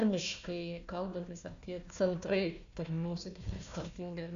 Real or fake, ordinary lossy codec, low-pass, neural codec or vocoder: fake; AAC, 96 kbps; 7.2 kHz; codec, 16 kHz, 1 kbps, FunCodec, trained on Chinese and English, 50 frames a second